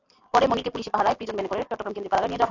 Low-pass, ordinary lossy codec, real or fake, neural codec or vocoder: 7.2 kHz; AAC, 48 kbps; real; none